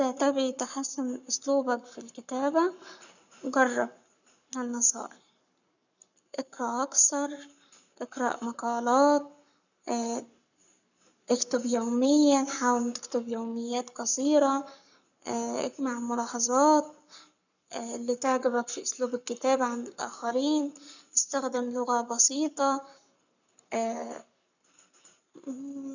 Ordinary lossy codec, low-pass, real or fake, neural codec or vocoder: none; 7.2 kHz; fake; codec, 44.1 kHz, 7.8 kbps, Pupu-Codec